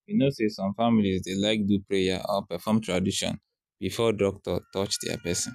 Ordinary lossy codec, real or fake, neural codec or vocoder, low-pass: none; real; none; 14.4 kHz